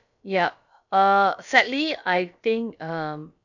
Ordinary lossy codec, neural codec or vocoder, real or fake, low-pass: none; codec, 16 kHz, about 1 kbps, DyCAST, with the encoder's durations; fake; 7.2 kHz